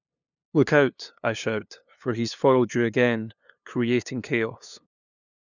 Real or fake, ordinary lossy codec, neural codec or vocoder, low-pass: fake; none; codec, 16 kHz, 2 kbps, FunCodec, trained on LibriTTS, 25 frames a second; 7.2 kHz